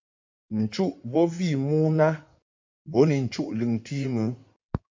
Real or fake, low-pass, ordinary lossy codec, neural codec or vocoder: fake; 7.2 kHz; MP3, 64 kbps; codec, 16 kHz in and 24 kHz out, 2.2 kbps, FireRedTTS-2 codec